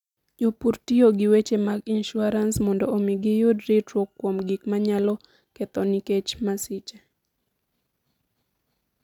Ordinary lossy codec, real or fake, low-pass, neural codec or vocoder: none; real; 19.8 kHz; none